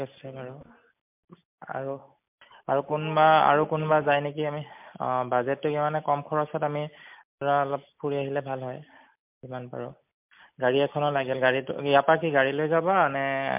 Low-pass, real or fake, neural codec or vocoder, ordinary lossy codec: 3.6 kHz; real; none; MP3, 32 kbps